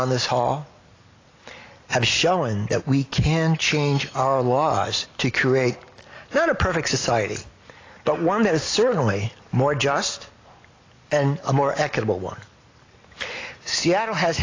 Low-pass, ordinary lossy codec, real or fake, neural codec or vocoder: 7.2 kHz; AAC, 32 kbps; real; none